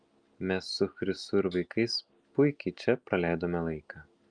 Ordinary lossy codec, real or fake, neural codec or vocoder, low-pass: Opus, 32 kbps; real; none; 9.9 kHz